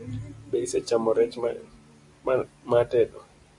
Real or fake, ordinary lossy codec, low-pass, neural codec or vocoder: real; AAC, 64 kbps; 10.8 kHz; none